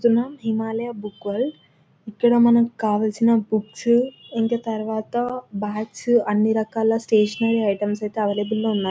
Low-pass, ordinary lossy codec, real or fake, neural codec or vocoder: none; none; real; none